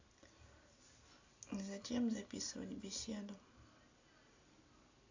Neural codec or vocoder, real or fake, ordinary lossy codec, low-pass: none; real; AAC, 48 kbps; 7.2 kHz